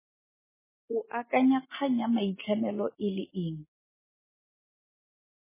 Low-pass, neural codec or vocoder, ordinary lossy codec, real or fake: 3.6 kHz; none; MP3, 16 kbps; real